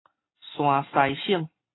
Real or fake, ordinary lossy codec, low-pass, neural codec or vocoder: real; AAC, 16 kbps; 7.2 kHz; none